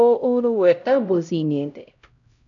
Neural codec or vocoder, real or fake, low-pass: codec, 16 kHz, 0.5 kbps, X-Codec, HuBERT features, trained on LibriSpeech; fake; 7.2 kHz